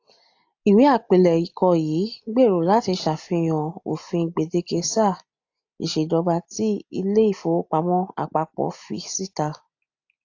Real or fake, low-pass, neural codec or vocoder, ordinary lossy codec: real; 7.2 kHz; none; AAC, 48 kbps